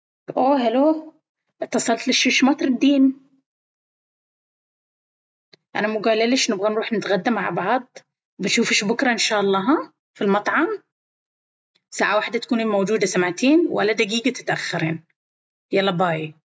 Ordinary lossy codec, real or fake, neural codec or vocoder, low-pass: none; real; none; none